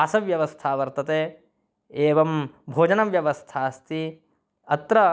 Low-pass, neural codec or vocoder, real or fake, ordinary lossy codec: none; none; real; none